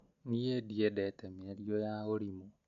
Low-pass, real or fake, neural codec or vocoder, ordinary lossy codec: 7.2 kHz; real; none; MP3, 64 kbps